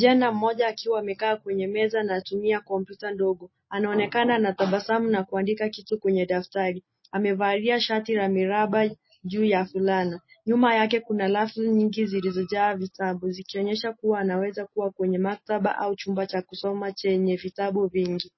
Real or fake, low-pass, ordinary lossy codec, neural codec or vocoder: real; 7.2 kHz; MP3, 24 kbps; none